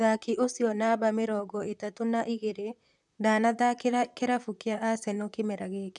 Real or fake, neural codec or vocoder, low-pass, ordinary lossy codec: fake; vocoder, 44.1 kHz, 128 mel bands, Pupu-Vocoder; 10.8 kHz; none